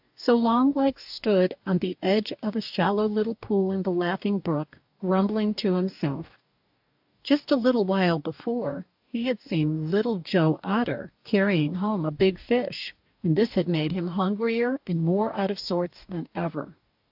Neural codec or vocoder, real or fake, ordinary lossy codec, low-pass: codec, 44.1 kHz, 2.6 kbps, DAC; fake; AAC, 48 kbps; 5.4 kHz